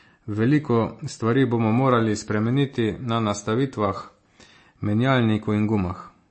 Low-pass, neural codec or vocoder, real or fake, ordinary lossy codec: 10.8 kHz; none; real; MP3, 32 kbps